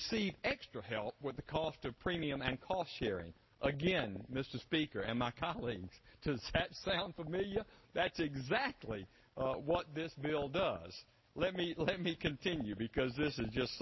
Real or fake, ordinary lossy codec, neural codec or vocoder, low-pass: real; MP3, 24 kbps; none; 7.2 kHz